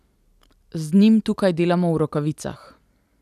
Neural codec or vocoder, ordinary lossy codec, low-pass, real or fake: none; none; 14.4 kHz; real